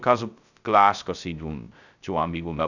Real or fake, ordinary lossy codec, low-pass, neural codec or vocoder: fake; none; 7.2 kHz; codec, 16 kHz, 0.2 kbps, FocalCodec